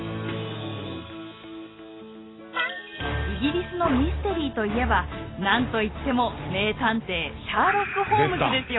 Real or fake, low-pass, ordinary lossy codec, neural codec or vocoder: real; 7.2 kHz; AAC, 16 kbps; none